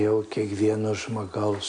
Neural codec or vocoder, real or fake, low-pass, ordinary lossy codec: none; real; 9.9 kHz; MP3, 64 kbps